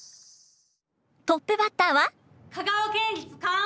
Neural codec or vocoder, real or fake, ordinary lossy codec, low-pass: none; real; none; none